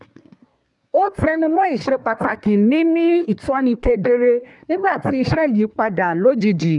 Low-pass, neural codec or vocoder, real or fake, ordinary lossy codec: 10.8 kHz; codec, 24 kHz, 1 kbps, SNAC; fake; none